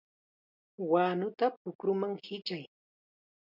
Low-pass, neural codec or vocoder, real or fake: 5.4 kHz; none; real